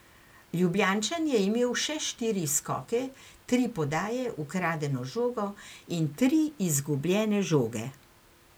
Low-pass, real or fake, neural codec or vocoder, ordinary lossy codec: none; real; none; none